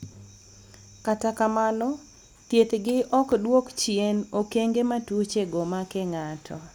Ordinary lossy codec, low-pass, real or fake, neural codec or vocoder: none; 19.8 kHz; real; none